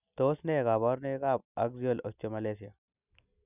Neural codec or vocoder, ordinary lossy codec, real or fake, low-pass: none; none; real; 3.6 kHz